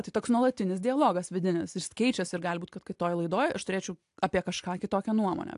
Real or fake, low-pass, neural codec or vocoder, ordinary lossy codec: real; 10.8 kHz; none; AAC, 64 kbps